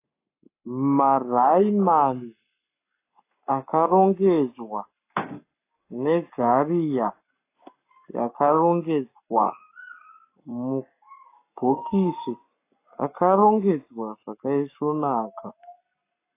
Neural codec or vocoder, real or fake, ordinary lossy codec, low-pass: codec, 44.1 kHz, 7.8 kbps, Pupu-Codec; fake; AAC, 24 kbps; 3.6 kHz